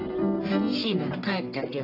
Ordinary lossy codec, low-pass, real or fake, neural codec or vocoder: AAC, 32 kbps; 5.4 kHz; fake; codec, 44.1 kHz, 1.7 kbps, Pupu-Codec